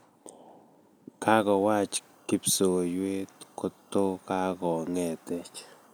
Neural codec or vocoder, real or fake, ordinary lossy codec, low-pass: none; real; none; none